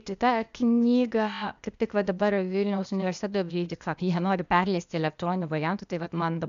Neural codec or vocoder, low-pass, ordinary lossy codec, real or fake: codec, 16 kHz, 0.8 kbps, ZipCodec; 7.2 kHz; AAC, 96 kbps; fake